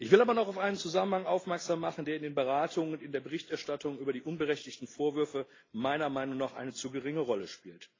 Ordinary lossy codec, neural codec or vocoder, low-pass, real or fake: AAC, 32 kbps; none; 7.2 kHz; real